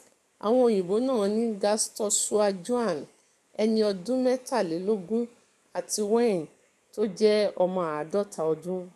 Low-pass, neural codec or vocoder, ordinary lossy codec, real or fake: 14.4 kHz; codec, 44.1 kHz, 7.8 kbps, DAC; none; fake